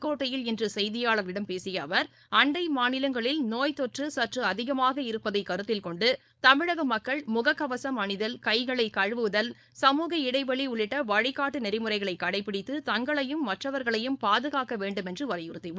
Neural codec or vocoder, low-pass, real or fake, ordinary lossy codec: codec, 16 kHz, 4.8 kbps, FACodec; none; fake; none